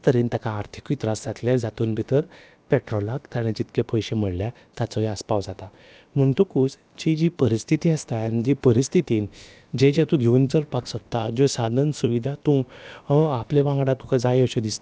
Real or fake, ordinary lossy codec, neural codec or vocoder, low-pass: fake; none; codec, 16 kHz, about 1 kbps, DyCAST, with the encoder's durations; none